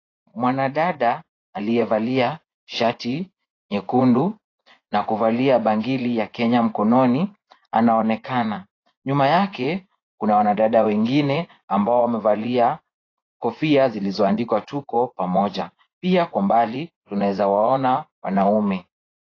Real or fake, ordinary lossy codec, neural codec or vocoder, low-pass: fake; AAC, 32 kbps; vocoder, 24 kHz, 100 mel bands, Vocos; 7.2 kHz